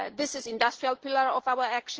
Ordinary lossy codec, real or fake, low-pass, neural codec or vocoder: Opus, 16 kbps; real; 7.2 kHz; none